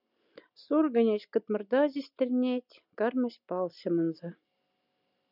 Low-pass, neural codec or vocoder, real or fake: 5.4 kHz; none; real